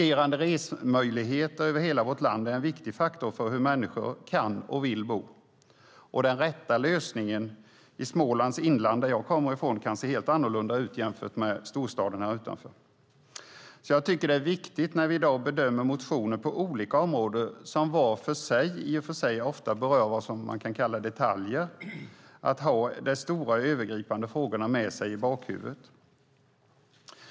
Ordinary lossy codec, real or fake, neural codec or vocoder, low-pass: none; real; none; none